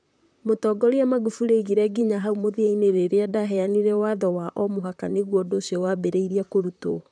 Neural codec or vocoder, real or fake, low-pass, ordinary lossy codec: vocoder, 44.1 kHz, 128 mel bands, Pupu-Vocoder; fake; 9.9 kHz; none